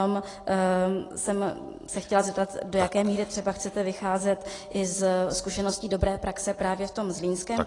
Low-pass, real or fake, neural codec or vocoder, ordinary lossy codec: 10.8 kHz; real; none; AAC, 32 kbps